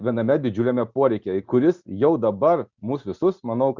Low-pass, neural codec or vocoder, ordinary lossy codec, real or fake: 7.2 kHz; none; Opus, 64 kbps; real